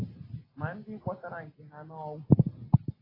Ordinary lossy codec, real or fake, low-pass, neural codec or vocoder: AAC, 24 kbps; real; 5.4 kHz; none